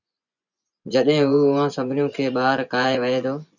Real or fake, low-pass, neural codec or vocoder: fake; 7.2 kHz; vocoder, 24 kHz, 100 mel bands, Vocos